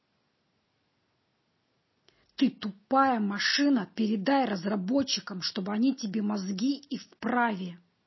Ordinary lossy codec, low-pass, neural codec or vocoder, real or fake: MP3, 24 kbps; 7.2 kHz; none; real